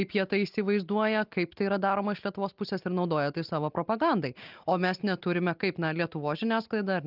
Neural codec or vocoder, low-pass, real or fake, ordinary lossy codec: none; 5.4 kHz; real; Opus, 24 kbps